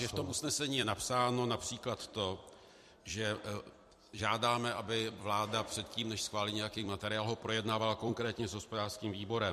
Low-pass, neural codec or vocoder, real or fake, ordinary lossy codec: 14.4 kHz; vocoder, 44.1 kHz, 128 mel bands every 256 samples, BigVGAN v2; fake; MP3, 64 kbps